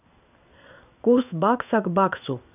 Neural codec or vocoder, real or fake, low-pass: codec, 16 kHz in and 24 kHz out, 1 kbps, XY-Tokenizer; fake; 3.6 kHz